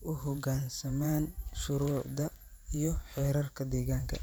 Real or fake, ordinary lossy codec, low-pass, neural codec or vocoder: fake; none; none; vocoder, 44.1 kHz, 128 mel bands every 512 samples, BigVGAN v2